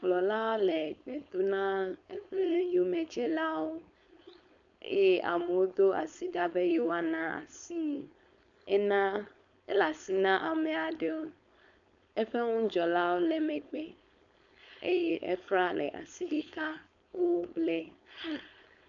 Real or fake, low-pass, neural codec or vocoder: fake; 7.2 kHz; codec, 16 kHz, 4.8 kbps, FACodec